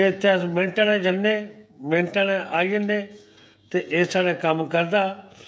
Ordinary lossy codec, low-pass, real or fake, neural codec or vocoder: none; none; fake; codec, 16 kHz, 16 kbps, FreqCodec, smaller model